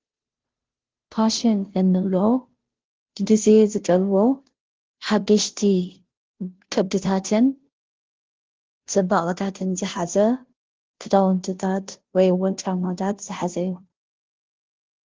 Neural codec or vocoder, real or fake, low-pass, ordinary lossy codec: codec, 16 kHz, 0.5 kbps, FunCodec, trained on Chinese and English, 25 frames a second; fake; 7.2 kHz; Opus, 16 kbps